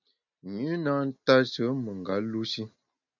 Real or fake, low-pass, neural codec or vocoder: real; 7.2 kHz; none